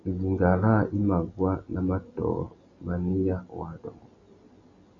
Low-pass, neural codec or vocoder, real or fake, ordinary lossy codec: 7.2 kHz; none; real; Opus, 64 kbps